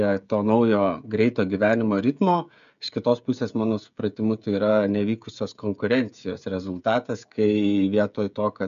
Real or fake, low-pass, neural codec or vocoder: fake; 7.2 kHz; codec, 16 kHz, 16 kbps, FreqCodec, smaller model